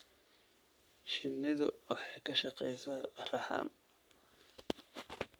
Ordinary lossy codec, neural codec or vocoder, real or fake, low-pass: none; codec, 44.1 kHz, 3.4 kbps, Pupu-Codec; fake; none